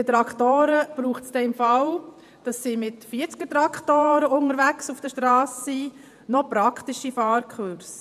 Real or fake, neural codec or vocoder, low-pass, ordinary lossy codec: fake; vocoder, 48 kHz, 128 mel bands, Vocos; 14.4 kHz; none